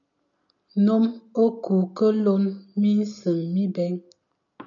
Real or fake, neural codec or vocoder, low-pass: real; none; 7.2 kHz